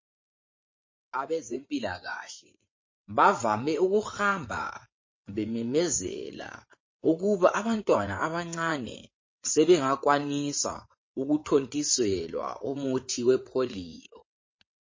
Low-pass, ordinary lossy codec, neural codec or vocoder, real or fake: 7.2 kHz; MP3, 32 kbps; vocoder, 22.05 kHz, 80 mel bands, WaveNeXt; fake